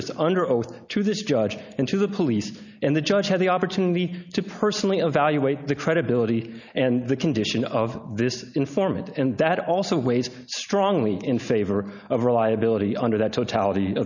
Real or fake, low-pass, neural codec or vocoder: real; 7.2 kHz; none